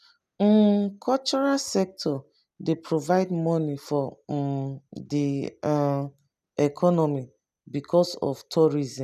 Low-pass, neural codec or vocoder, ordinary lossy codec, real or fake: 14.4 kHz; none; none; real